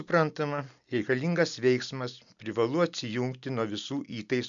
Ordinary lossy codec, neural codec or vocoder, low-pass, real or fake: MP3, 64 kbps; none; 7.2 kHz; real